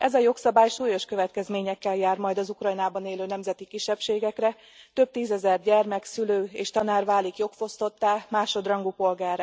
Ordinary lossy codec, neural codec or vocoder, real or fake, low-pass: none; none; real; none